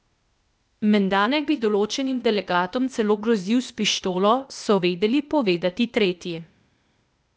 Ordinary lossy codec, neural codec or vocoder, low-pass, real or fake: none; codec, 16 kHz, 0.8 kbps, ZipCodec; none; fake